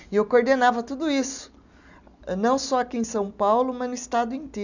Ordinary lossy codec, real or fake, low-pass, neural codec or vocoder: none; real; 7.2 kHz; none